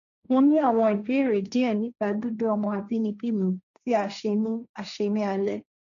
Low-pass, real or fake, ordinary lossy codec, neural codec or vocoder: 7.2 kHz; fake; none; codec, 16 kHz, 1.1 kbps, Voila-Tokenizer